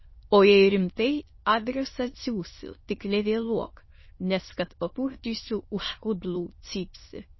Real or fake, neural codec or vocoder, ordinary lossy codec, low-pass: fake; autoencoder, 22.05 kHz, a latent of 192 numbers a frame, VITS, trained on many speakers; MP3, 24 kbps; 7.2 kHz